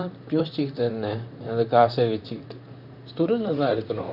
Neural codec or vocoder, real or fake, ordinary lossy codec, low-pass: vocoder, 44.1 kHz, 128 mel bands, Pupu-Vocoder; fake; none; 5.4 kHz